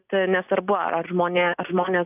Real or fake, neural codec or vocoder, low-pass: real; none; 3.6 kHz